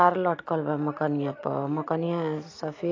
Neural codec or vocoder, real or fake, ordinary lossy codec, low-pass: none; real; AAC, 32 kbps; 7.2 kHz